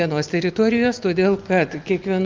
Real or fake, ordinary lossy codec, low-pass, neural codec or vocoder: real; Opus, 24 kbps; 7.2 kHz; none